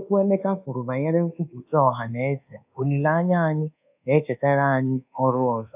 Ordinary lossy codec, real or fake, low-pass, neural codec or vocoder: AAC, 32 kbps; fake; 3.6 kHz; codec, 24 kHz, 1.2 kbps, DualCodec